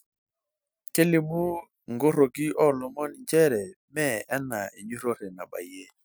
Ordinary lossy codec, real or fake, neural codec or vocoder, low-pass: none; real; none; none